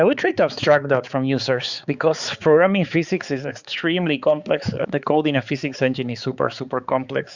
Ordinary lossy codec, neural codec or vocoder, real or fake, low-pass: Opus, 64 kbps; codec, 16 kHz, 4 kbps, X-Codec, HuBERT features, trained on balanced general audio; fake; 7.2 kHz